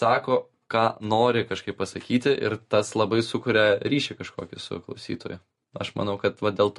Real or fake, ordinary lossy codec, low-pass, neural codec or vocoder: real; MP3, 48 kbps; 14.4 kHz; none